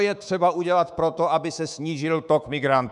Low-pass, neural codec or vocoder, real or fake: 10.8 kHz; codec, 24 kHz, 3.1 kbps, DualCodec; fake